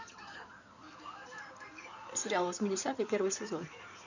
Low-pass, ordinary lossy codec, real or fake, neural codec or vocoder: 7.2 kHz; none; fake; codec, 16 kHz in and 24 kHz out, 2.2 kbps, FireRedTTS-2 codec